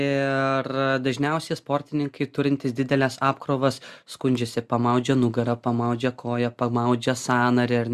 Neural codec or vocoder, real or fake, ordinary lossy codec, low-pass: none; real; Opus, 64 kbps; 14.4 kHz